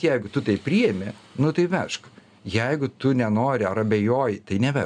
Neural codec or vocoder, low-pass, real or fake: none; 9.9 kHz; real